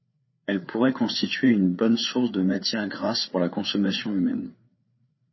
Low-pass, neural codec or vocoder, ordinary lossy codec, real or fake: 7.2 kHz; codec, 16 kHz, 4 kbps, FreqCodec, larger model; MP3, 24 kbps; fake